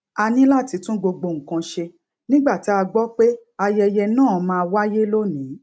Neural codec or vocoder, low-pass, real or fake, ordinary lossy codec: none; none; real; none